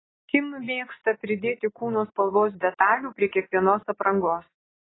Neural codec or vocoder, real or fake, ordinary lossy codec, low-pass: none; real; AAC, 16 kbps; 7.2 kHz